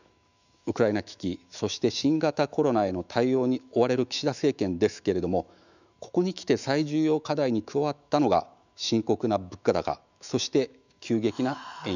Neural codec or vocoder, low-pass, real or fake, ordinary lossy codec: autoencoder, 48 kHz, 128 numbers a frame, DAC-VAE, trained on Japanese speech; 7.2 kHz; fake; none